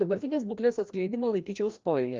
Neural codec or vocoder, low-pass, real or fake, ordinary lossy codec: codec, 16 kHz, 1 kbps, FreqCodec, larger model; 7.2 kHz; fake; Opus, 32 kbps